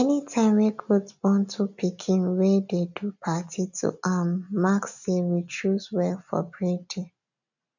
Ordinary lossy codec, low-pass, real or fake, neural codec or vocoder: none; 7.2 kHz; real; none